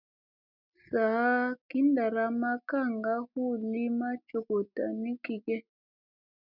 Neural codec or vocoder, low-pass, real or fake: none; 5.4 kHz; real